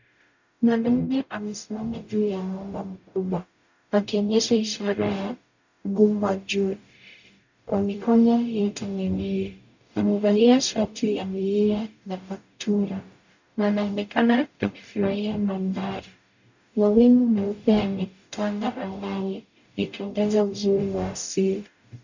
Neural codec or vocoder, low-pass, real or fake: codec, 44.1 kHz, 0.9 kbps, DAC; 7.2 kHz; fake